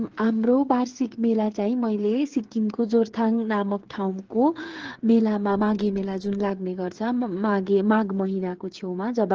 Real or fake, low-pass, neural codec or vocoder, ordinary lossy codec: fake; 7.2 kHz; codec, 16 kHz, 8 kbps, FreqCodec, smaller model; Opus, 16 kbps